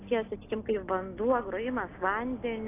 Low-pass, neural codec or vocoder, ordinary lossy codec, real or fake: 3.6 kHz; none; AAC, 24 kbps; real